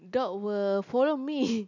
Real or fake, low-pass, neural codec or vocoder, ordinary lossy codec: real; 7.2 kHz; none; none